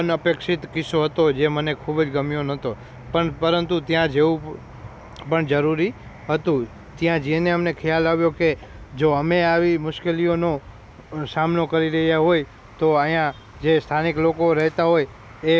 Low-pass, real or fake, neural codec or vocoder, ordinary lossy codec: none; real; none; none